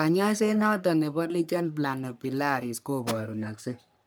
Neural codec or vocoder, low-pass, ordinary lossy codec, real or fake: codec, 44.1 kHz, 3.4 kbps, Pupu-Codec; none; none; fake